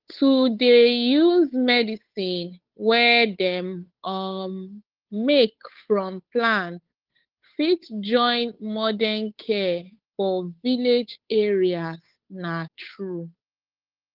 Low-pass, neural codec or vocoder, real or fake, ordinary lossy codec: 5.4 kHz; codec, 16 kHz, 8 kbps, FunCodec, trained on Chinese and English, 25 frames a second; fake; Opus, 32 kbps